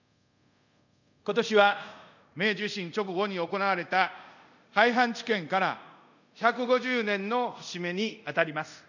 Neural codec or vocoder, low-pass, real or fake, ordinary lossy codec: codec, 24 kHz, 0.5 kbps, DualCodec; 7.2 kHz; fake; none